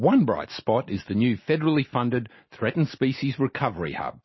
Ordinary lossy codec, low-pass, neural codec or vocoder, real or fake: MP3, 24 kbps; 7.2 kHz; none; real